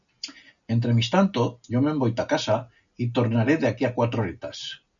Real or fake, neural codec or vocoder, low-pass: real; none; 7.2 kHz